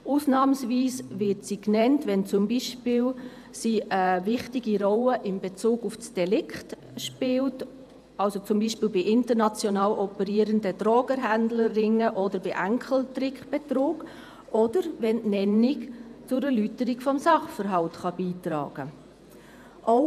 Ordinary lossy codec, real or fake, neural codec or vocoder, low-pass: none; fake; vocoder, 48 kHz, 128 mel bands, Vocos; 14.4 kHz